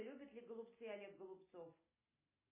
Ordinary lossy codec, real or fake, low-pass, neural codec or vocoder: MP3, 16 kbps; real; 3.6 kHz; none